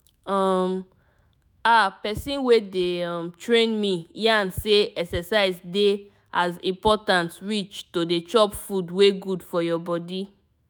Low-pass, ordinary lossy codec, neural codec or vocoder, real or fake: none; none; autoencoder, 48 kHz, 128 numbers a frame, DAC-VAE, trained on Japanese speech; fake